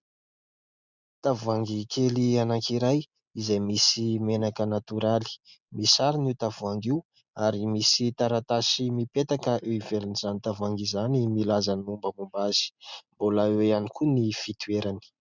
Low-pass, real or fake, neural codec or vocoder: 7.2 kHz; real; none